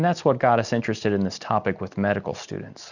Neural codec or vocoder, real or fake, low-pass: none; real; 7.2 kHz